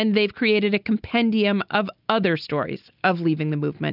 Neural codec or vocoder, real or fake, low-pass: none; real; 5.4 kHz